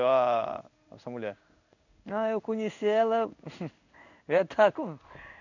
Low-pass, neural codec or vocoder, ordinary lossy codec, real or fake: 7.2 kHz; codec, 16 kHz in and 24 kHz out, 1 kbps, XY-Tokenizer; none; fake